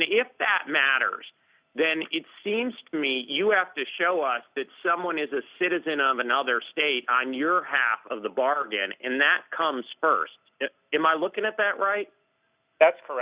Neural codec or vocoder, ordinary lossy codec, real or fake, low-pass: none; Opus, 64 kbps; real; 3.6 kHz